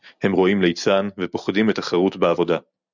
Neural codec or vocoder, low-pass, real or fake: none; 7.2 kHz; real